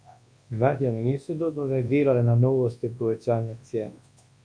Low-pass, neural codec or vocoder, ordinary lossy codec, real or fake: 9.9 kHz; codec, 24 kHz, 0.9 kbps, WavTokenizer, large speech release; MP3, 48 kbps; fake